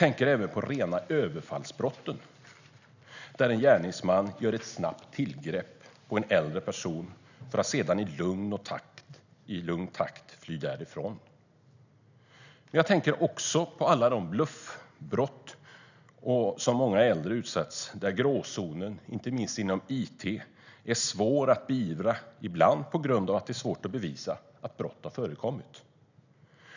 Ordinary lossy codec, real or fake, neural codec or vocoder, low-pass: none; real; none; 7.2 kHz